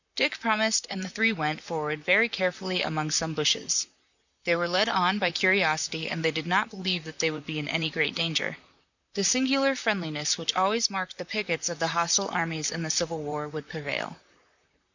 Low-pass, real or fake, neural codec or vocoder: 7.2 kHz; fake; vocoder, 44.1 kHz, 128 mel bands, Pupu-Vocoder